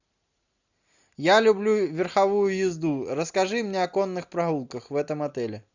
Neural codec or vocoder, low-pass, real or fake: none; 7.2 kHz; real